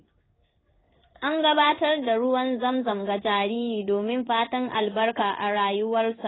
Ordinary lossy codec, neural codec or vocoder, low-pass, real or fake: AAC, 16 kbps; codec, 16 kHz in and 24 kHz out, 1 kbps, XY-Tokenizer; 7.2 kHz; fake